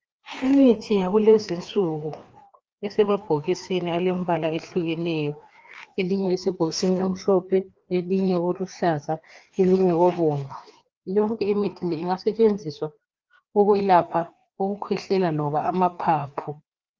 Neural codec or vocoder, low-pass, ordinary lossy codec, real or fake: codec, 16 kHz, 2 kbps, FreqCodec, larger model; 7.2 kHz; Opus, 24 kbps; fake